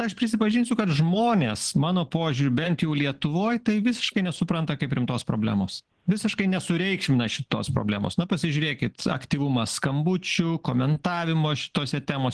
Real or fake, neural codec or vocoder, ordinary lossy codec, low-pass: real; none; Opus, 16 kbps; 10.8 kHz